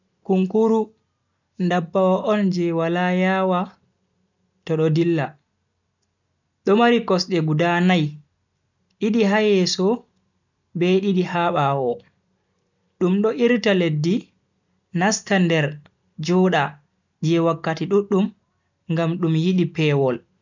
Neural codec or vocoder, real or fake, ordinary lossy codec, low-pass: none; real; none; 7.2 kHz